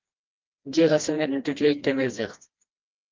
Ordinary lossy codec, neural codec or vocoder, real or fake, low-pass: Opus, 32 kbps; codec, 16 kHz, 1 kbps, FreqCodec, smaller model; fake; 7.2 kHz